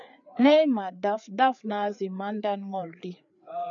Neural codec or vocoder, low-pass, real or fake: codec, 16 kHz, 4 kbps, FreqCodec, larger model; 7.2 kHz; fake